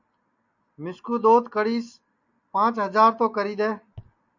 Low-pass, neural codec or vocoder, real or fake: 7.2 kHz; none; real